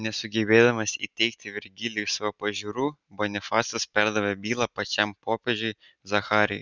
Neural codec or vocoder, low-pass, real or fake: none; 7.2 kHz; real